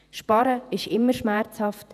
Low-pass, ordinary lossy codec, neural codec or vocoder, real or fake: 14.4 kHz; none; vocoder, 48 kHz, 128 mel bands, Vocos; fake